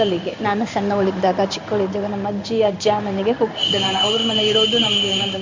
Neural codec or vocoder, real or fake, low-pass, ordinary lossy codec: codec, 16 kHz in and 24 kHz out, 1 kbps, XY-Tokenizer; fake; 7.2 kHz; MP3, 48 kbps